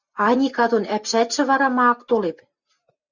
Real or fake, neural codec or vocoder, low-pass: real; none; 7.2 kHz